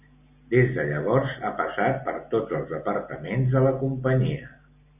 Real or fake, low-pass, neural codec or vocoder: real; 3.6 kHz; none